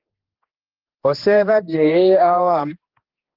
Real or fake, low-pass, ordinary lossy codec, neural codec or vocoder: fake; 5.4 kHz; Opus, 16 kbps; codec, 16 kHz, 2 kbps, X-Codec, HuBERT features, trained on general audio